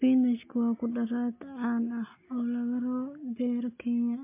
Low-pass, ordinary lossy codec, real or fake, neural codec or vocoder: 3.6 kHz; MP3, 24 kbps; real; none